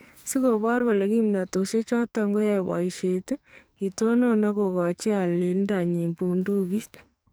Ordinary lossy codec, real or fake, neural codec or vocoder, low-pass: none; fake; codec, 44.1 kHz, 2.6 kbps, SNAC; none